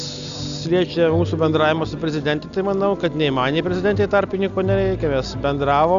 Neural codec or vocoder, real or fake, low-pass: none; real; 7.2 kHz